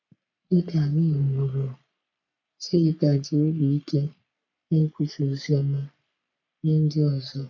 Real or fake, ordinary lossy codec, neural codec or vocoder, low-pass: fake; none; codec, 44.1 kHz, 3.4 kbps, Pupu-Codec; 7.2 kHz